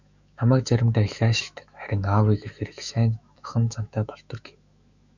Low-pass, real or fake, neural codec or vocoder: 7.2 kHz; fake; autoencoder, 48 kHz, 128 numbers a frame, DAC-VAE, trained on Japanese speech